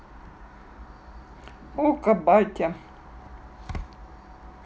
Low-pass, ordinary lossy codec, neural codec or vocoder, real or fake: none; none; none; real